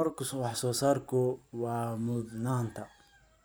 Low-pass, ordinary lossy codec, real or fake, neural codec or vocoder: none; none; fake; vocoder, 44.1 kHz, 128 mel bands every 512 samples, BigVGAN v2